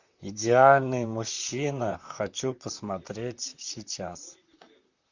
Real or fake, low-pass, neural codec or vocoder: fake; 7.2 kHz; vocoder, 44.1 kHz, 128 mel bands, Pupu-Vocoder